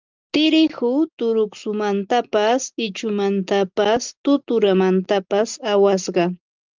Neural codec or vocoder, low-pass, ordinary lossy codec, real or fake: none; 7.2 kHz; Opus, 24 kbps; real